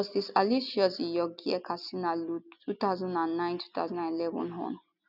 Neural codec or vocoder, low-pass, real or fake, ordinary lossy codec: none; 5.4 kHz; real; none